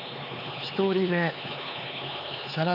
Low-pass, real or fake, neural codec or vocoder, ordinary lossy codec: 5.4 kHz; fake; codec, 16 kHz, 4 kbps, X-Codec, HuBERT features, trained on LibriSpeech; none